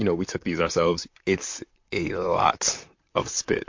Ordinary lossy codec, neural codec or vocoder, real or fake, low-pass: MP3, 48 kbps; vocoder, 44.1 kHz, 128 mel bands, Pupu-Vocoder; fake; 7.2 kHz